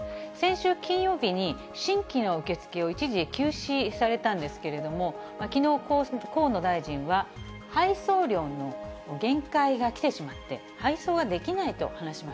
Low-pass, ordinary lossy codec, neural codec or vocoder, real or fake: none; none; none; real